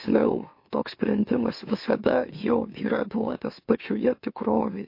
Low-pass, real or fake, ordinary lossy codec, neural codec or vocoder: 5.4 kHz; fake; MP3, 32 kbps; autoencoder, 44.1 kHz, a latent of 192 numbers a frame, MeloTTS